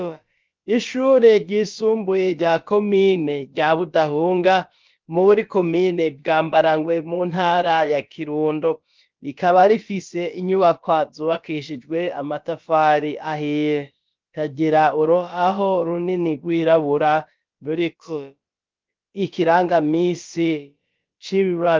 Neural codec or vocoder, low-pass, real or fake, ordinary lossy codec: codec, 16 kHz, about 1 kbps, DyCAST, with the encoder's durations; 7.2 kHz; fake; Opus, 32 kbps